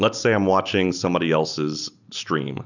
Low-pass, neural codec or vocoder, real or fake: 7.2 kHz; none; real